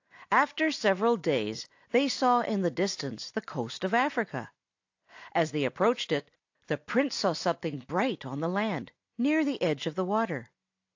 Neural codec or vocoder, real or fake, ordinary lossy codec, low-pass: none; real; AAC, 48 kbps; 7.2 kHz